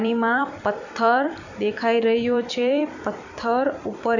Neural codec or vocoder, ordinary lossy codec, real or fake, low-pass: vocoder, 44.1 kHz, 128 mel bands every 256 samples, BigVGAN v2; none; fake; 7.2 kHz